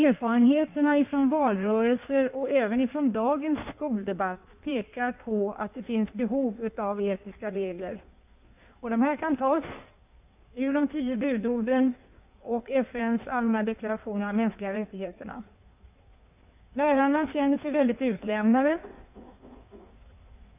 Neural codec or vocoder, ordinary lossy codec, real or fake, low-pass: codec, 16 kHz in and 24 kHz out, 1.1 kbps, FireRedTTS-2 codec; none; fake; 3.6 kHz